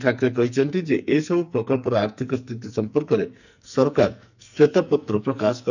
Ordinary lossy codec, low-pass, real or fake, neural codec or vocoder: none; 7.2 kHz; fake; codec, 44.1 kHz, 2.6 kbps, SNAC